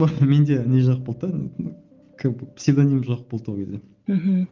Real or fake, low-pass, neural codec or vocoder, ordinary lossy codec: real; 7.2 kHz; none; Opus, 24 kbps